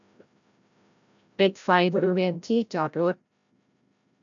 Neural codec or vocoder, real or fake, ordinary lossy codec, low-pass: codec, 16 kHz, 0.5 kbps, FreqCodec, larger model; fake; none; 7.2 kHz